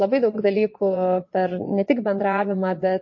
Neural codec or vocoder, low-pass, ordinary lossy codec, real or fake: vocoder, 22.05 kHz, 80 mel bands, Vocos; 7.2 kHz; MP3, 32 kbps; fake